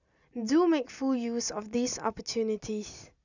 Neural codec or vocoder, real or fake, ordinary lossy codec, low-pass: none; real; none; 7.2 kHz